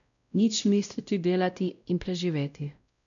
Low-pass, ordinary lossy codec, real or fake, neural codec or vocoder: 7.2 kHz; none; fake; codec, 16 kHz, 0.5 kbps, X-Codec, WavLM features, trained on Multilingual LibriSpeech